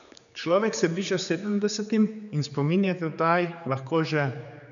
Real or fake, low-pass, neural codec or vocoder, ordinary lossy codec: fake; 7.2 kHz; codec, 16 kHz, 4 kbps, X-Codec, HuBERT features, trained on general audio; none